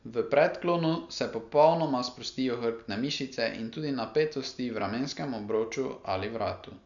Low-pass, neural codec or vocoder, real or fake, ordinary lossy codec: 7.2 kHz; none; real; none